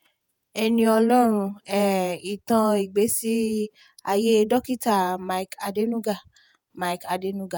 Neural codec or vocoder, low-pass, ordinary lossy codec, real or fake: vocoder, 48 kHz, 128 mel bands, Vocos; none; none; fake